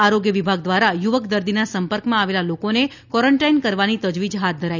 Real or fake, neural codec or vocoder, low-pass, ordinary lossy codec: real; none; 7.2 kHz; none